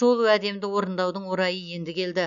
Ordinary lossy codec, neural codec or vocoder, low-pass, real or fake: none; none; 7.2 kHz; real